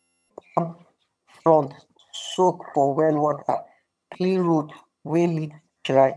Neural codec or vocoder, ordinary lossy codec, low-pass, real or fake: vocoder, 22.05 kHz, 80 mel bands, HiFi-GAN; none; none; fake